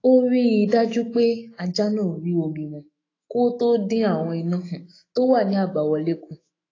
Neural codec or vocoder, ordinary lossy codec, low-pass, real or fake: autoencoder, 48 kHz, 128 numbers a frame, DAC-VAE, trained on Japanese speech; AAC, 32 kbps; 7.2 kHz; fake